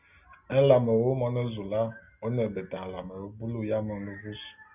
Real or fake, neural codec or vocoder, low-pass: real; none; 3.6 kHz